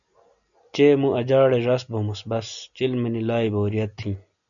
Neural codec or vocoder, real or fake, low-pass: none; real; 7.2 kHz